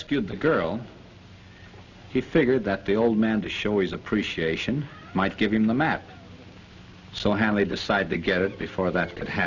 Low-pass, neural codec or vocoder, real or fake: 7.2 kHz; none; real